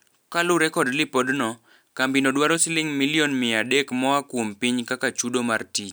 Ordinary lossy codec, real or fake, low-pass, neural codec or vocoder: none; real; none; none